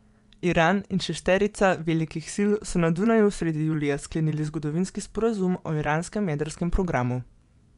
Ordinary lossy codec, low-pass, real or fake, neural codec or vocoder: none; 10.8 kHz; fake; vocoder, 24 kHz, 100 mel bands, Vocos